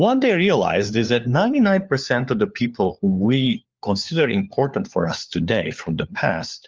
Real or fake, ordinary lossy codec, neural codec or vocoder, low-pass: fake; Opus, 32 kbps; codec, 16 kHz, 4 kbps, FunCodec, trained on LibriTTS, 50 frames a second; 7.2 kHz